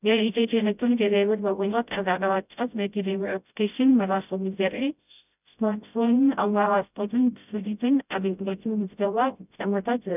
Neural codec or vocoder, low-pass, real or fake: codec, 16 kHz, 0.5 kbps, FreqCodec, smaller model; 3.6 kHz; fake